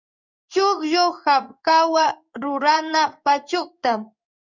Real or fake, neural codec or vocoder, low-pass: fake; codec, 16 kHz in and 24 kHz out, 1 kbps, XY-Tokenizer; 7.2 kHz